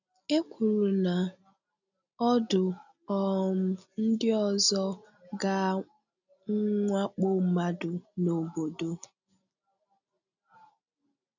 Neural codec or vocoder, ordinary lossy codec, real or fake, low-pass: none; none; real; 7.2 kHz